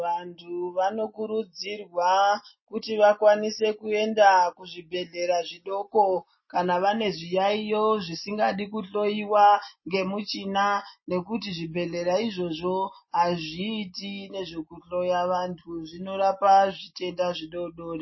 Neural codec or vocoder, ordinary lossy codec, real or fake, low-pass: none; MP3, 24 kbps; real; 7.2 kHz